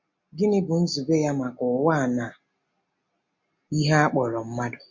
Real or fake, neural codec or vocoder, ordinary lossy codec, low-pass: real; none; MP3, 48 kbps; 7.2 kHz